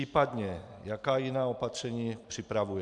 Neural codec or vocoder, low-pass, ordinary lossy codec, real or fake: vocoder, 44.1 kHz, 128 mel bands every 256 samples, BigVGAN v2; 10.8 kHz; AAC, 64 kbps; fake